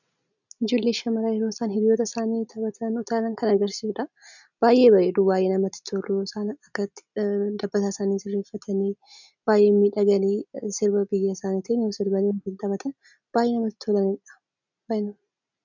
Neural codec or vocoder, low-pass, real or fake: none; 7.2 kHz; real